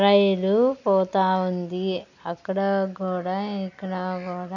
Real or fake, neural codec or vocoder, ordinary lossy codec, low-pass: real; none; none; 7.2 kHz